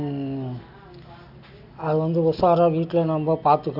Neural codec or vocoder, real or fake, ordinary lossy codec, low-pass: none; real; none; 5.4 kHz